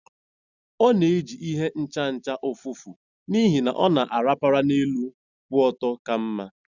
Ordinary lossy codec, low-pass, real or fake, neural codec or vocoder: Opus, 64 kbps; 7.2 kHz; real; none